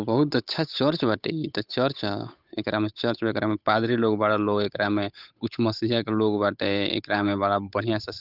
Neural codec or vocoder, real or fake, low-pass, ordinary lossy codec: codec, 16 kHz, 8 kbps, FunCodec, trained on Chinese and English, 25 frames a second; fake; 5.4 kHz; none